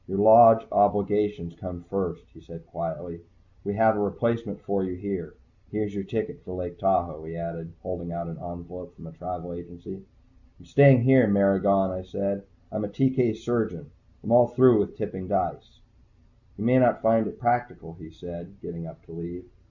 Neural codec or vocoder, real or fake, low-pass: none; real; 7.2 kHz